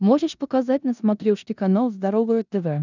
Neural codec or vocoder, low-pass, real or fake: codec, 16 kHz in and 24 kHz out, 0.9 kbps, LongCat-Audio-Codec, four codebook decoder; 7.2 kHz; fake